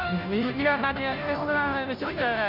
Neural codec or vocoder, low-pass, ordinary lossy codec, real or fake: codec, 16 kHz, 0.5 kbps, FunCodec, trained on Chinese and English, 25 frames a second; 5.4 kHz; none; fake